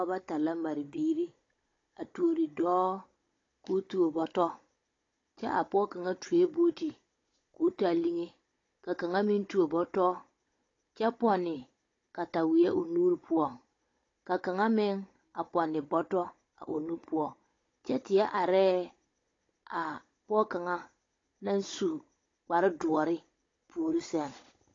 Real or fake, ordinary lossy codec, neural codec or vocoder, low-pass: fake; AAC, 32 kbps; codec, 16 kHz, 16 kbps, FunCodec, trained on Chinese and English, 50 frames a second; 7.2 kHz